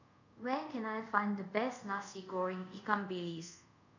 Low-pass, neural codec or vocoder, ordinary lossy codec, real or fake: 7.2 kHz; codec, 24 kHz, 0.5 kbps, DualCodec; none; fake